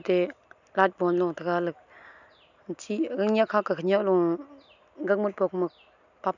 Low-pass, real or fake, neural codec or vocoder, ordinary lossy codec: 7.2 kHz; real; none; none